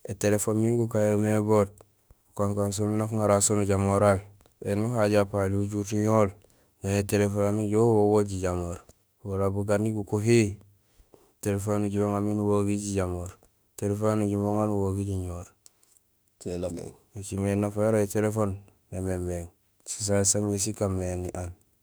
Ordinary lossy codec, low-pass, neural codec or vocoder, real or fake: none; none; autoencoder, 48 kHz, 32 numbers a frame, DAC-VAE, trained on Japanese speech; fake